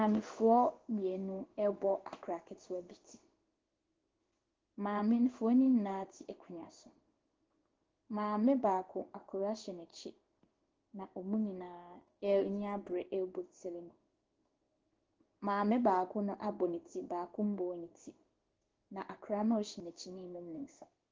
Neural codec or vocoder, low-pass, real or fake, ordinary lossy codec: codec, 16 kHz in and 24 kHz out, 1 kbps, XY-Tokenizer; 7.2 kHz; fake; Opus, 16 kbps